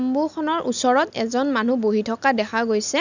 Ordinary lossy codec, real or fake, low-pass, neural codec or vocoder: none; real; 7.2 kHz; none